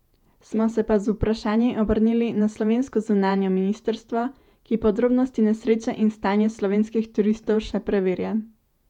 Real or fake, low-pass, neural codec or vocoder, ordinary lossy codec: fake; 19.8 kHz; vocoder, 48 kHz, 128 mel bands, Vocos; none